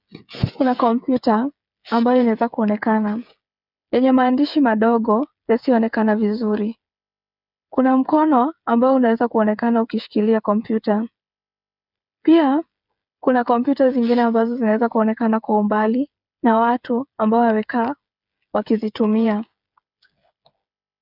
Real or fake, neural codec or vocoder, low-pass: fake; codec, 16 kHz, 8 kbps, FreqCodec, smaller model; 5.4 kHz